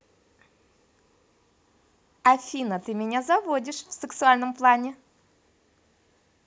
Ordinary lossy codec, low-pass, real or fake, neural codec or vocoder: none; none; real; none